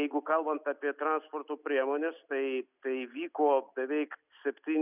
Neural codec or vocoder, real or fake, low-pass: none; real; 3.6 kHz